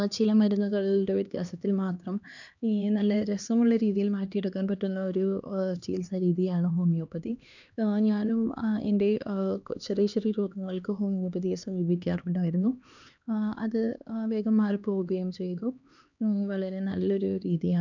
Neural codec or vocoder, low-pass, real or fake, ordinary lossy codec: codec, 16 kHz, 2 kbps, X-Codec, HuBERT features, trained on LibriSpeech; 7.2 kHz; fake; none